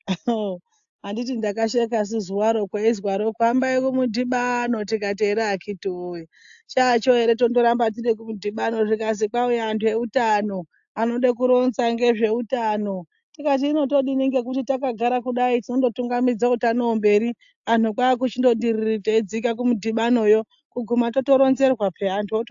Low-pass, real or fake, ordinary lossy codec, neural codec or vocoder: 7.2 kHz; real; AAC, 64 kbps; none